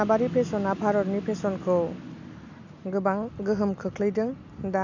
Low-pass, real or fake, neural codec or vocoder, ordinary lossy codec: 7.2 kHz; real; none; none